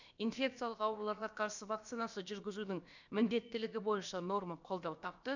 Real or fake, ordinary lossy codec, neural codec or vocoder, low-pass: fake; none; codec, 16 kHz, about 1 kbps, DyCAST, with the encoder's durations; 7.2 kHz